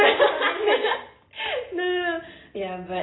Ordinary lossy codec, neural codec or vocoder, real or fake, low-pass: AAC, 16 kbps; none; real; 7.2 kHz